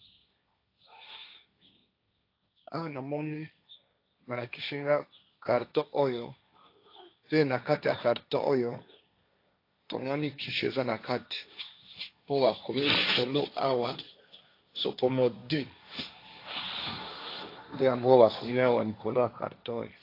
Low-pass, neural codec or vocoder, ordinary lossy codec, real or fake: 5.4 kHz; codec, 16 kHz, 1.1 kbps, Voila-Tokenizer; AAC, 32 kbps; fake